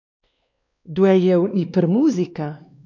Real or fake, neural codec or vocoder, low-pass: fake; codec, 16 kHz, 2 kbps, X-Codec, WavLM features, trained on Multilingual LibriSpeech; 7.2 kHz